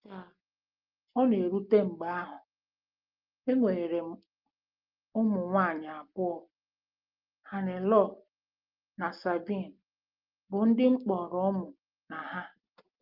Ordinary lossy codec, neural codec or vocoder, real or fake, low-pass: Opus, 32 kbps; none; real; 5.4 kHz